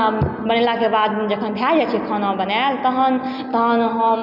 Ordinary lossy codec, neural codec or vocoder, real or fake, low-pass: none; none; real; 5.4 kHz